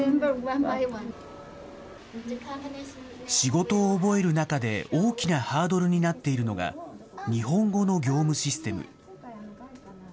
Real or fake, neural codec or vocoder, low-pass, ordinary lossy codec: real; none; none; none